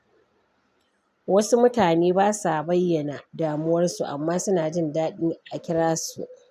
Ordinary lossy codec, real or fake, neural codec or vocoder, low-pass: none; real; none; 14.4 kHz